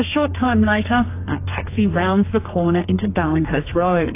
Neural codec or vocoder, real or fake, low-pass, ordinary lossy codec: codec, 32 kHz, 1.9 kbps, SNAC; fake; 3.6 kHz; AAC, 24 kbps